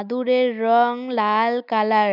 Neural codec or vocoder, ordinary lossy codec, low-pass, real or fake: none; none; 5.4 kHz; real